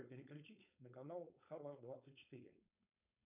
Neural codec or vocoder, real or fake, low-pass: codec, 16 kHz, 4.8 kbps, FACodec; fake; 3.6 kHz